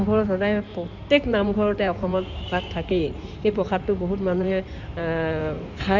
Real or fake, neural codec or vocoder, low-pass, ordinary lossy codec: fake; codec, 16 kHz in and 24 kHz out, 2.2 kbps, FireRedTTS-2 codec; 7.2 kHz; none